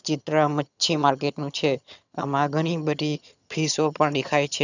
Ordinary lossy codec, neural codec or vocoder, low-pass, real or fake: none; vocoder, 22.05 kHz, 80 mel bands, HiFi-GAN; 7.2 kHz; fake